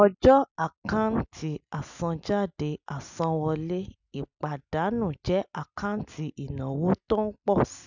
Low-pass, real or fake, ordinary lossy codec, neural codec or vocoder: 7.2 kHz; real; none; none